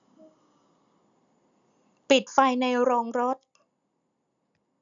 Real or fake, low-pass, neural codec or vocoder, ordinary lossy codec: real; 7.2 kHz; none; none